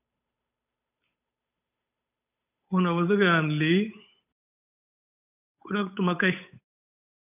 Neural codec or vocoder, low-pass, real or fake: codec, 16 kHz, 8 kbps, FunCodec, trained on Chinese and English, 25 frames a second; 3.6 kHz; fake